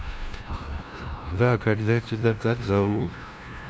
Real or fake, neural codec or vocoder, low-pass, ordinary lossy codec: fake; codec, 16 kHz, 0.5 kbps, FunCodec, trained on LibriTTS, 25 frames a second; none; none